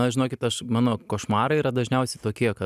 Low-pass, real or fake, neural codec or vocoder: 14.4 kHz; real; none